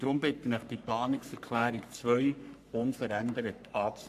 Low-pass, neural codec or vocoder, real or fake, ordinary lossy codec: 14.4 kHz; codec, 44.1 kHz, 3.4 kbps, Pupu-Codec; fake; AAC, 96 kbps